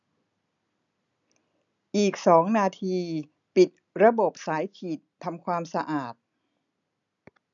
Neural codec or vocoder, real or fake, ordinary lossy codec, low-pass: none; real; none; 7.2 kHz